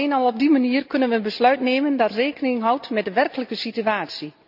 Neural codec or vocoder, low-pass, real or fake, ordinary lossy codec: none; 5.4 kHz; real; none